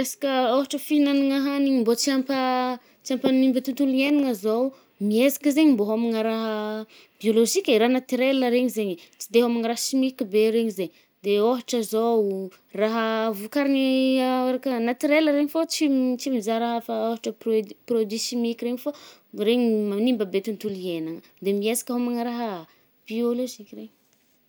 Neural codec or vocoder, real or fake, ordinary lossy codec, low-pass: none; real; none; none